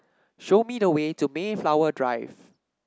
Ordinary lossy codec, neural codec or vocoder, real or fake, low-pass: none; none; real; none